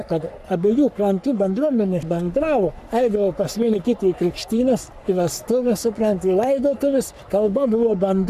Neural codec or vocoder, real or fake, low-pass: codec, 44.1 kHz, 3.4 kbps, Pupu-Codec; fake; 14.4 kHz